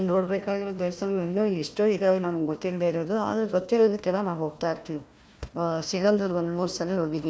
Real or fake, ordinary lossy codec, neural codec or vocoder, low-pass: fake; none; codec, 16 kHz, 1 kbps, FunCodec, trained on Chinese and English, 50 frames a second; none